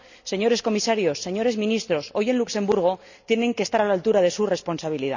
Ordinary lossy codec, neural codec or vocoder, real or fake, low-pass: none; none; real; 7.2 kHz